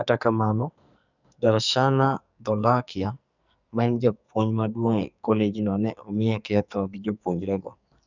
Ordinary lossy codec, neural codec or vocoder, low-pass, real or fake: none; codec, 44.1 kHz, 2.6 kbps, SNAC; 7.2 kHz; fake